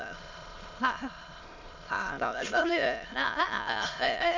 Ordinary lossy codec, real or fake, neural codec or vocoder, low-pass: MP3, 64 kbps; fake; autoencoder, 22.05 kHz, a latent of 192 numbers a frame, VITS, trained on many speakers; 7.2 kHz